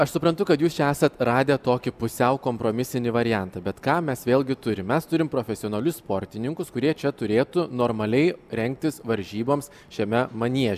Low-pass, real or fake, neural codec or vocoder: 14.4 kHz; real; none